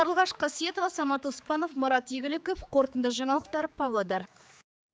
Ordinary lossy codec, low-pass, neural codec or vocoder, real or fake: none; none; codec, 16 kHz, 4 kbps, X-Codec, HuBERT features, trained on general audio; fake